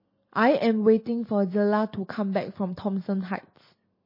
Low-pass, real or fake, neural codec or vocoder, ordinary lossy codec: 5.4 kHz; real; none; MP3, 24 kbps